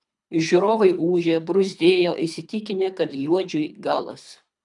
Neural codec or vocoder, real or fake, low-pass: codec, 24 kHz, 3 kbps, HILCodec; fake; 10.8 kHz